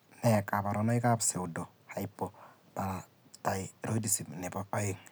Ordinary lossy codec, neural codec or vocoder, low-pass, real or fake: none; none; none; real